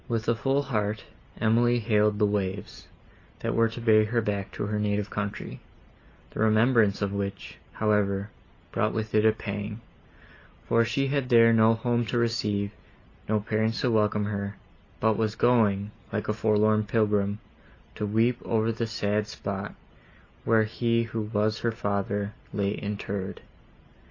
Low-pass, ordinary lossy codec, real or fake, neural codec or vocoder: 7.2 kHz; AAC, 32 kbps; real; none